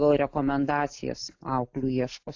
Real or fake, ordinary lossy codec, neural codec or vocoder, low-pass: real; AAC, 48 kbps; none; 7.2 kHz